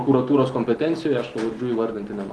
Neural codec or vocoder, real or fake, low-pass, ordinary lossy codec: none; real; 10.8 kHz; Opus, 16 kbps